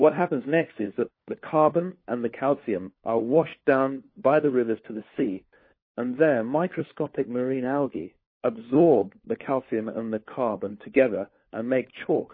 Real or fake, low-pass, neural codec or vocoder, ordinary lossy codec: fake; 5.4 kHz; codec, 16 kHz, 4 kbps, FunCodec, trained on LibriTTS, 50 frames a second; MP3, 24 kbps